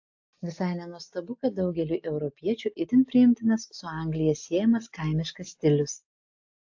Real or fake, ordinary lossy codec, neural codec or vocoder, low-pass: real; AAC, 48 kbps; none; 7.2 kHz